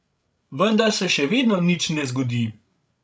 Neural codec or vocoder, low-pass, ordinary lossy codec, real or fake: codec, 16 kHz, 16 kbps, FreqCodec, larger model; none; none; fake